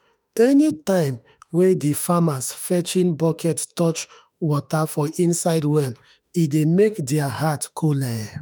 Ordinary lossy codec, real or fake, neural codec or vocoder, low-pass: none; fake; autoencoder, 48 kHz, 32 numbers a frame, DAC-VAE, trained on Japanese speech; none